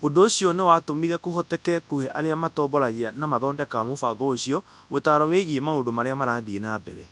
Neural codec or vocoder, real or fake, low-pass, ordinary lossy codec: codec, 24 kHz, 0.9 kbps, WavTokenizer, large speech release; fake; 10.8 kHz; none